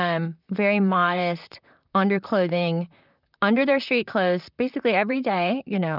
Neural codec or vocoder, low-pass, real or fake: vocoder, 44.1 kHz, 128 mel bands, Pupu-Vocoder; 5.4 kHz; fake